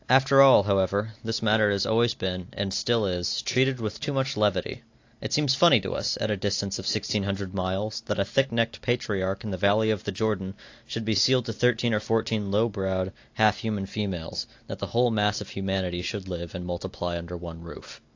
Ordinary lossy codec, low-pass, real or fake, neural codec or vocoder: AAC, 48 kbps; 7.2 kHz; real; none